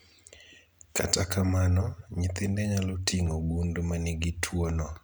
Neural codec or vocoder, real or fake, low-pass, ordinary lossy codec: none; real; none; none